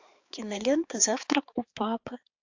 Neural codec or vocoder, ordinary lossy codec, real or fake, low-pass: codec, 16 kHz, 4 kbps, X-Codec, HuBERT features, trained on balanced general audio; AAC, 48 kbps; fake; 7.2 kHz